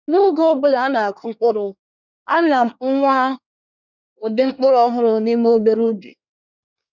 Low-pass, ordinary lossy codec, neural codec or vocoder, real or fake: 7.2 kHz; none; codec, 24 kHz, 1 kbps, SNAC; fake